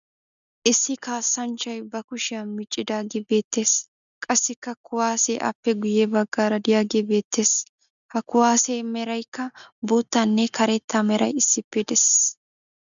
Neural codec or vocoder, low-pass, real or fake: none; 7.2 kHz; real